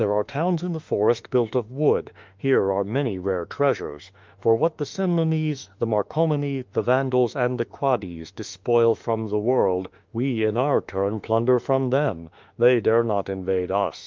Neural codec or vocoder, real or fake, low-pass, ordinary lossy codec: autoencoder, 48 kHz, 32 numbers a frame, DAC-VAE, trained on Japanese speech; fake; 7.2 kHz; Opus, 32 kbps